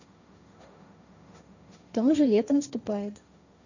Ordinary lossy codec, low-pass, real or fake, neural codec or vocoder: none; 7.2 kHz; fake; codec, 16 kHz, 1.1 kbps, Voila-Tokenizer